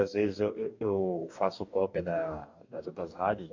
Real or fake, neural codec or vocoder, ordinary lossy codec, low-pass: fake; codec, 44.1 kHz, 2.6 kbps, DAC; MP3, 48 kbps; 7.2 kHz